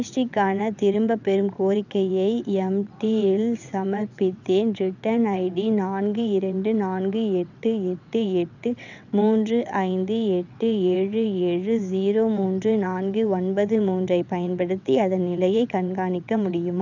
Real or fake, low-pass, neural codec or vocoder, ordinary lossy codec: fake; 7.2 kHz; vocoder, 22.05 kHz, 80 mel bands, WaveNeXt; none